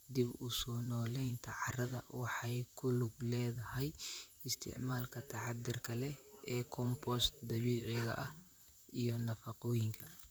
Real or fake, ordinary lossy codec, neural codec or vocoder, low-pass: fake; none; vocoder, 44.1 kHz, 128 mel bands, Pupu-Vocoder; none